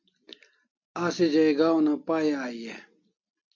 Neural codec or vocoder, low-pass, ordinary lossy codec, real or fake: none; 7.2 kHz; MP3, 48 kbps; real